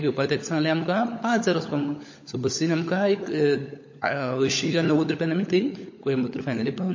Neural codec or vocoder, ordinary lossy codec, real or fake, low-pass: codec, 16 kHz, 16 kbps, FunCodec, trained on LibriTTS, 50 frames a second; MP3, 32 kbps; fake; 7.2 kHz